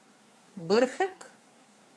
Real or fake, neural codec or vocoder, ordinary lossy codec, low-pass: fake; codec, 24 kHz, 1 kbps, SNAC; none; none